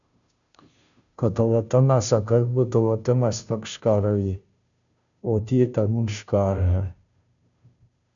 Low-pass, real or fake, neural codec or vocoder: 7.2 kHz; fake; codec, 16 kHz, 0.5 kbps, FunCodec, trained on Chinese and English, 25 frames a second